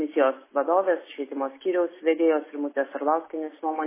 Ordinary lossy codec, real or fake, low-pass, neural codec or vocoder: MP3, 16 kbps; real; 3.6 kHz; none